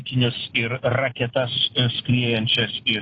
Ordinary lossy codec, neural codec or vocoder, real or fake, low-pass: AAC, 32 kbps; none; real; 7.2 kHz